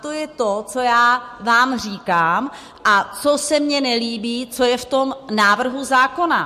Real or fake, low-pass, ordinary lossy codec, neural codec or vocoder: real; 14.4 kHz; MP3, 64 kbps; none